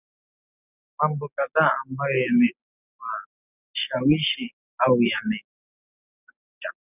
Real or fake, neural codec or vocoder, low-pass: real; none; 3.6 kHz